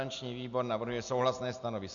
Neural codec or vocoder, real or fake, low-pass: none; real; 7.2 kHz